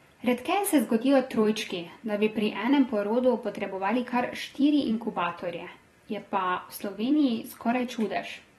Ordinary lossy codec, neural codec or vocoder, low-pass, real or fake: AAC, 32 kbps; vocoder, 44.1 kHz, 128 mel bands every 256 samples, BigVGAN v2; 19.8 kHz; fake